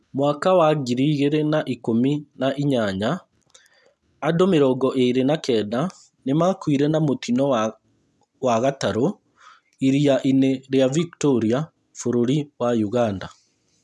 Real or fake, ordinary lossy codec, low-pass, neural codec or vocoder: real; none; none; none